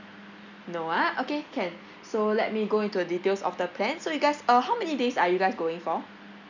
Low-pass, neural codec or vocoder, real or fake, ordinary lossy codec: 7.2 kHz; none; real; none